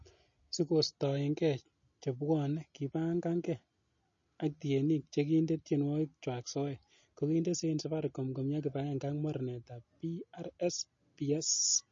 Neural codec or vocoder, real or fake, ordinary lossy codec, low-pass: none; real; MP3, 32 kbps; 7.2 kHz